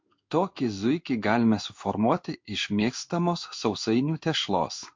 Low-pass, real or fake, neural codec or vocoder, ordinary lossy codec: 7.2 kHz; fake; codec, 16 kHz in and 24 kHz out, 1 kbps, XY-Tokenizer; MP3, 48 kbps